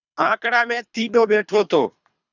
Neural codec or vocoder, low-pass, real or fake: codec, 24 kHz, 3 kbps, HILCodec; 7.2 kHz; fake